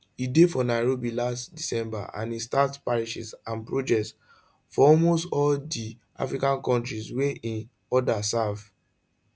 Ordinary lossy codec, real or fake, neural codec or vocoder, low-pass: none; real; none; none